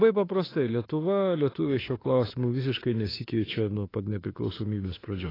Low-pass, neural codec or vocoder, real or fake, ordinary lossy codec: 5.4 kHz; autoencoder, 48 kHz, 32 numbers a frame, DAC-VAE, trained on Japanese speech; fake; AAC, 24 kbps